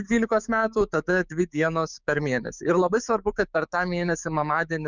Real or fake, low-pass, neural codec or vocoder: fake; 7.2 kHz; codec, 16 kHz, 6 kbps, DAC